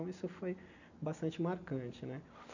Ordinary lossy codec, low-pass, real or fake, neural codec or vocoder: none; 7.2 kHz; real; none